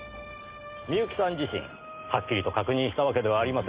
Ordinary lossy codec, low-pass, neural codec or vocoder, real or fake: Opus, 24 kbps; 3.6 kHz; none; real